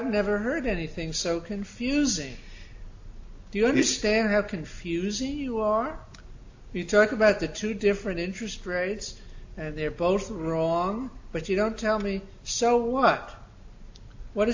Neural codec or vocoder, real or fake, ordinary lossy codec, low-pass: none; real; AAC, 48 kbps; 7.2 kHz